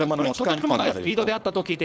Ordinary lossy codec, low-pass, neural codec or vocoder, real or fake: none; none; codec, 16 kHz, 4.8 kbps, FACodec; fake